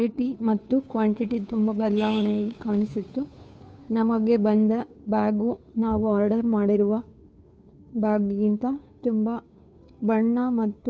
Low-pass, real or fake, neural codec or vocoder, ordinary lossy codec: none; fake; codec, 16 kHz, 2 kbps, FunCodec, trained on Chinese and English, 25 frames a second; none